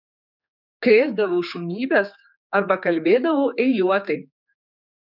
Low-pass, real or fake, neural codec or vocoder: 5.4 kHz; fake; codec, 16 kHz, 4 kbps, X-Codec, HuBERT features, trained on general audio